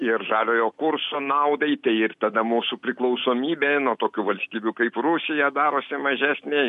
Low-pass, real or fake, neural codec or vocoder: 10.8 kHz; real; none